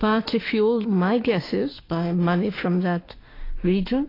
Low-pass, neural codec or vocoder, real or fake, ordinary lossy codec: 5.4 kHz; autoencoder, 48 kHz, 32 numbers a frame, DAC-VAE, trained on Japanese speech; fake; AAC, 24 kbps